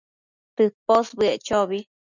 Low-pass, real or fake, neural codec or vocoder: 7.2 kHz; real; none